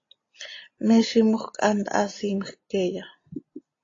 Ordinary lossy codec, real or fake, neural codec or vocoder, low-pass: AAC, 32 kbps; real; none; 7.2 kHz